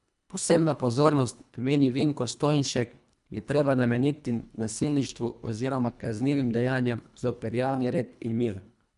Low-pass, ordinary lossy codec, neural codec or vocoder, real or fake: 10.8 kHz; none; codec, 24 kHz, 1.5 kbps, HILCodec; fake